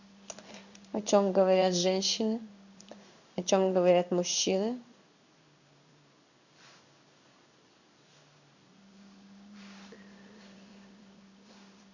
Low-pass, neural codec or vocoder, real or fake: 7.2 kHz; codec, 16 kHz in and 24 kHz out, 1 kbps, XY-Tokenizer; fake